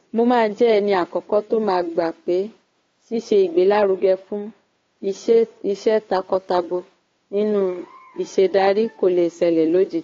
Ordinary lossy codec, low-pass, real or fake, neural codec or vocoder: AAC, 32 kbps; 7.2 kHz; fake; codec, 16 kHz, 2 kbps, FunCodec, trained on Chinese and English, 25 frames a second